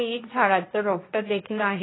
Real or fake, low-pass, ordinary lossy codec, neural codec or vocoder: fake; 7.2 kHz; AAC, 16 kbps; codec, 16 kHz, 1.1 kbps, Voila-Tokenizer